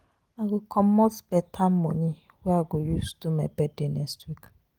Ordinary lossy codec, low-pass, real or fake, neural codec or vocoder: Opus, 24 kbps; 19.8 kHz; real; none